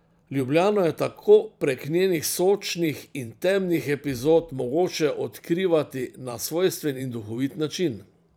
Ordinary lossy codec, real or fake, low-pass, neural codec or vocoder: none; fake; none; vocoder, 44.1 kHz, 128 mel bands every 512 samples, BigVGAN v2